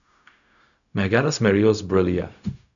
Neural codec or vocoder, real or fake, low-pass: codec, 16 kHz, 0.4 kbps, LongCat-Audio-Codec; fake; 7.2 kHz